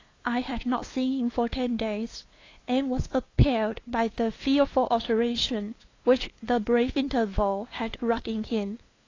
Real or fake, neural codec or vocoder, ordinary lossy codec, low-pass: fake; codec, 16 kHz, 2 kbps, FunCodec, trained on LibriTTS, 25 frames a second; AAC, 32 kbps; 7.2 kHz